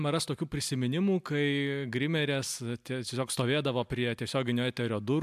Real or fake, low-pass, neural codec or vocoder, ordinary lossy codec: real; 14.4 kHz; none; AAC, 96 kbps